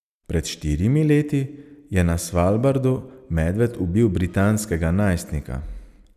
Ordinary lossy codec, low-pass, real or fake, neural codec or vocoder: none; 14.4 kHz; real; none